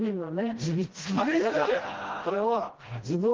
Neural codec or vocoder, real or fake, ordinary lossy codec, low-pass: codec, 16 kHz, 1 kbps, FreqCodec, smaller model; fake; Opus, 16 kbps; 7.2 kHz